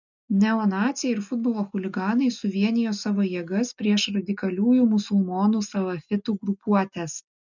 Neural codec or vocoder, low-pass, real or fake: none; 7.2 kHz; real